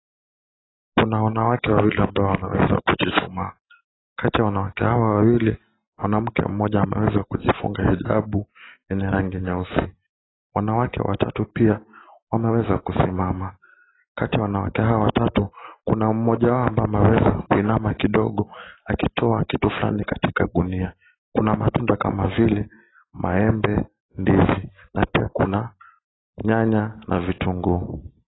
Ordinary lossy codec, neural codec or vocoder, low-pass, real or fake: AAC, 16 kbps; none; 7.2 kHz; real